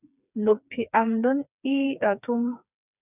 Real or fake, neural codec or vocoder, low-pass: fake; codec, 16 kHz, 4 kbps, FreqCodec, smaller model; 3.6 kHz